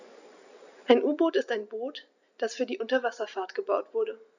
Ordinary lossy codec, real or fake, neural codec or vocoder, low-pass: none; real; none; 7.2 kHz